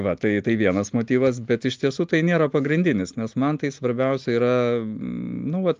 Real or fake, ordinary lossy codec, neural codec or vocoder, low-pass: real; Opus, 32 kbps; none; 7.2 kHz